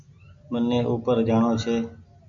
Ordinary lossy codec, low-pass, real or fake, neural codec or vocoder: MP3, 64 kbps; 7.2 kHz; real; none